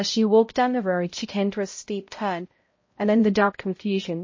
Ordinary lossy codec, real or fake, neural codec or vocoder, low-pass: MP3, 32 kbps; fake; codec, 16 kHz, 0.5 kbps, X-Codec, HuBERT features, trained on balanced general audio; 7.2 kHz